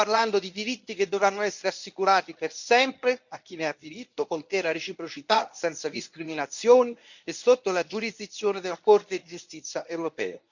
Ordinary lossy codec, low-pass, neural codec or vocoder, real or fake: none; 7.2 kHz; codec, 24 kHz, 0.9 kbps, WavTokenizer, medium speech release version 1; fake